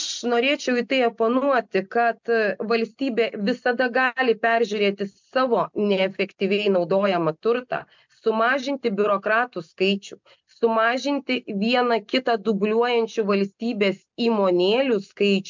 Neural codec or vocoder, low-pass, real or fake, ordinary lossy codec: none; 7.2 kHz; real; MP3, 64 kbps